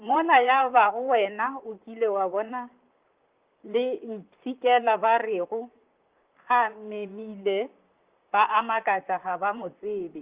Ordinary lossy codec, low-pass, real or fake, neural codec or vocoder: Opus, 24 kbps; 3.6 kHz; fake; vocoder, 44.1 kHz, 128 mel bands, Pupu-Vocoder